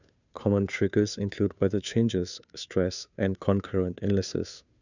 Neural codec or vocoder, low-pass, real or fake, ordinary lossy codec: codec, 16 kHz, 2 kbps, FunCodec, trained on Chinese and English, 25 frames a second; 7.2 kHz; fake; none